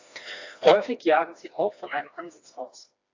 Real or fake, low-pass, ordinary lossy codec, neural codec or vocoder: fake; 7.2 kHz; AAC, 48 kbps; codec, 16 kHz, 2 kbps, FreqCodec, smaller model